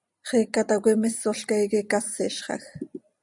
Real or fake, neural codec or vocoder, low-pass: real; none; 10.8 kHz